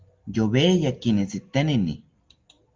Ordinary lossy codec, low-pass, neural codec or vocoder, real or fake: Opus, 32 kbps; 7.2 kHz; none; real